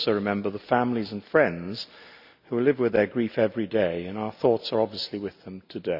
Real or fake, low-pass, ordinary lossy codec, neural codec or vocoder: real; 5.4 kHz; none; none